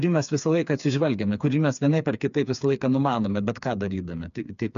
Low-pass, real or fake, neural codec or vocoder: 7.2 kHz; fake; codec, 16 kHz, 4 kbps, FreqCodec, smaller model